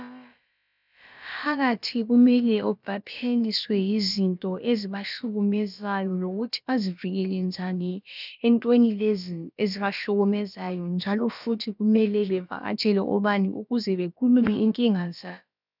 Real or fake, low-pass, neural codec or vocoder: fake; 5.4 kHz; codec, 16 kHz, about 1 kbps, DyCAST, with the encoder's durations